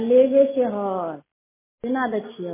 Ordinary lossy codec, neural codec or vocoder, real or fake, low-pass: MP3, 16 kbps; none; real; 3.6 kHz